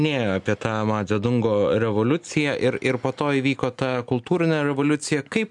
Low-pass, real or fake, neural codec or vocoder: 10.8 kHz; real; none